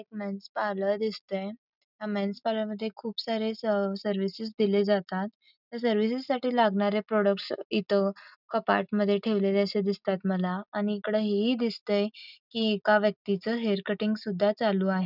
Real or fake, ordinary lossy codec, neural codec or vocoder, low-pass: real; none; none; 5.4 kHz